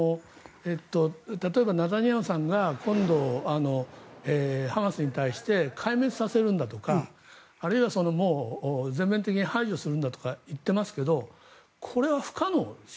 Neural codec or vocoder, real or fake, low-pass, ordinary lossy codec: none; real; none; none